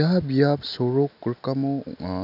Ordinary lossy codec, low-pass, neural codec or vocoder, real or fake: none; 5.4 kHz; none; real